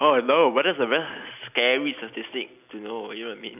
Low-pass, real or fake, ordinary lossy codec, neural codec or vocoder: 3.6 kHz; real; none; none